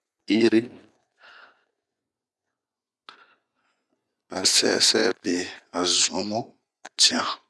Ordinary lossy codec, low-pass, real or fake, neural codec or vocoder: none; none; fake; vocoder, 24 kHz, 100 mel bands, Vocos